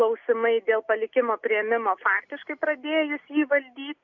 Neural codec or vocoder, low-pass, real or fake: none; 7.2 kHz; real